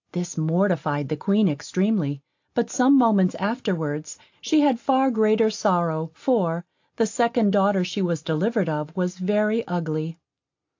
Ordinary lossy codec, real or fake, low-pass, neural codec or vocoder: AAC, 48 kbps; real; 7.2 kHz; none